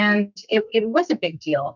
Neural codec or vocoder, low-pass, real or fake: codec, 44.1 kHz, 2.6 kbps, SNAC; 7.2 kHz; fake